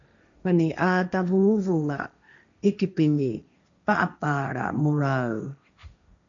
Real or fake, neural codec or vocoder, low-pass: fake; codec, 16 kHz, 1.1 kbps, Voila-Tokenizer; 7.2 kHz